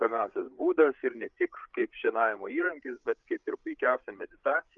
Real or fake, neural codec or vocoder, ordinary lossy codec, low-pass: fake; codec, 16 kHz, 8 kbps, FreqCodec, larger model; Opus, 32 kbps; 7.2 kHz